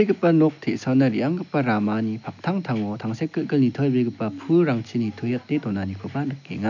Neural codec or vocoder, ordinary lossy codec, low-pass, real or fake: none; none; 7.2 kHz; real